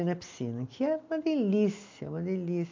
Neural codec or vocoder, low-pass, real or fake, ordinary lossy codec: none; 7.2 kHz; real; MP3, 48 kbps